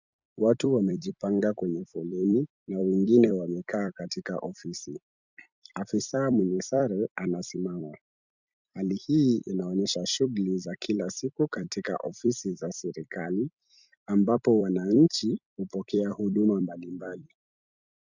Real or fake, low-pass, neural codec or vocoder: fake; 7.2 kHz; vocoder, 44.1 kHz, 128 mel bands every 256 samples, BigVGAN v2